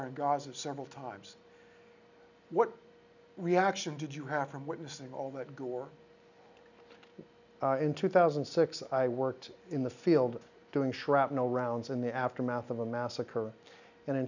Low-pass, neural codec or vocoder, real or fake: 7.2 kHz; none; real